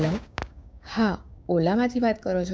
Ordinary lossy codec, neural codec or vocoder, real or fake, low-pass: none; codec, 16 kHz, 6 kbps, DAC; fake; none